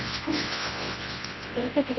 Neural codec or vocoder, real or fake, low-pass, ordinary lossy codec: codec, 24 kHz, 0.9 kbps, WavTokenizer, large speech release; fake; 7.2 kHz; MP3, 24 kbps